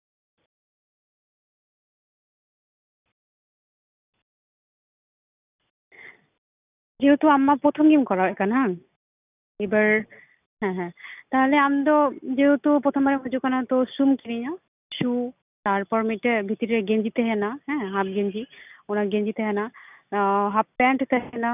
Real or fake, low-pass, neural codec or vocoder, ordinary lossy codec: real; 3.6 kHz; none; none